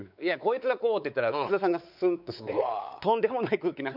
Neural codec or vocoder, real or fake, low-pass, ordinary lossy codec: codec, 16 kHz, 4 kbps, X-Codec, WavLM features, trained on Multilingual LibriSpeech; fake; 5.4 kHz; none